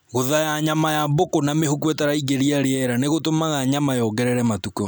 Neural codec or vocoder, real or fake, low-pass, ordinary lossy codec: none; real; none; none